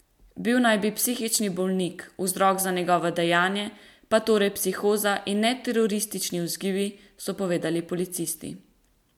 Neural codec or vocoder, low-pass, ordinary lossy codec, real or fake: none; 19.8 kHz; MP3, 96 kbps; real